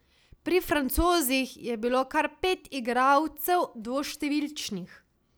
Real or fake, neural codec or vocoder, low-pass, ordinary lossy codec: real; none; none; none